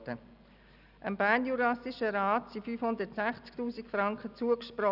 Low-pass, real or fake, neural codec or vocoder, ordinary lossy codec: 5.4 kHz; real; none; none